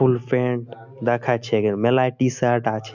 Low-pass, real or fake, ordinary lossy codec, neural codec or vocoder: 7.2 kHz; real; none; none